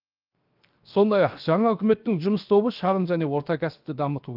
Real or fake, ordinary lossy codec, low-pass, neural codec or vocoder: fake; Opus, 32 kbps; 5.4 kHz; codec, 16 kHz, 0.7 kbps, FocalCodec